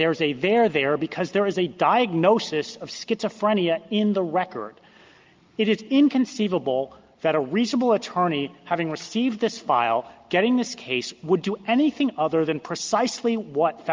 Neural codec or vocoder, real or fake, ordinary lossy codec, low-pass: none; real; Opus, 32 kbps; 7.2 kHz